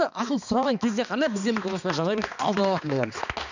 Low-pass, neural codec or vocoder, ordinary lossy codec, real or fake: 7.2 kHz; codec, 16 kHz, 2 kbps, X-Codec, HuBERT features, trained on balanced general audio; none; fake